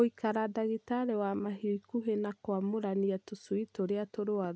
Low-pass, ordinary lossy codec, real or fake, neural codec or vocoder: none; none; real; none